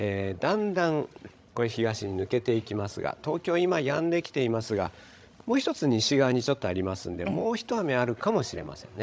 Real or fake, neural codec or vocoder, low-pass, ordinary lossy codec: fake; codec, 16 kHz, 16 kbps, FreqCodec, larger model; none; none